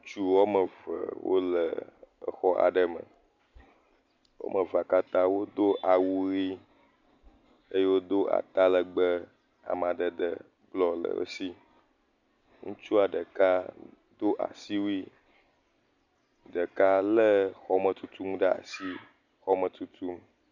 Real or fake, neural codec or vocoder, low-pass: real; none; 7.2 kHz